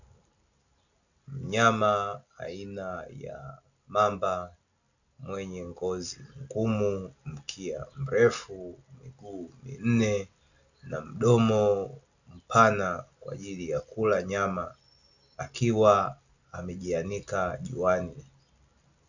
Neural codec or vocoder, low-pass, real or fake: none; 7.2 kHz; real